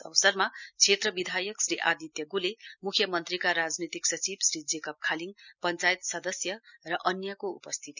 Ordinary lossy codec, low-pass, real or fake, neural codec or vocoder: none; 7.2 kHz; real; none